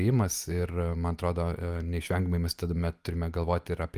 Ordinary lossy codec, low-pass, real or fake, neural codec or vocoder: Opus, 32 kbps; 14.4 kHz; real; none